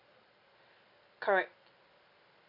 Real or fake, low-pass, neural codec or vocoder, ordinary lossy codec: real; 5.4 kHz; none; none